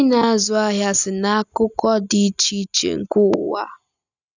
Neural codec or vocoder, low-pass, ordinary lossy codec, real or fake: none; 7.2 kHz; none; real